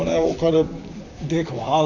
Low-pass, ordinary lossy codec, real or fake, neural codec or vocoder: 7.2 kHz; none; fake; vocoder, 44.1 kHz, 128 mel bands, Pupu-Vocoder